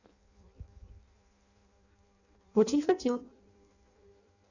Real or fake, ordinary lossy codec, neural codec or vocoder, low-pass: fake; none; codec, 16 kHz in and 24 kHz out, 0.6 kbps, FireRedTTS-2 codec; 7.2 kHz